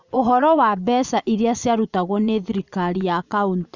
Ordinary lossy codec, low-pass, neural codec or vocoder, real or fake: none; 7.2 kHz; vocoder, 24 kHz, 100 mel bands, Vocos; fake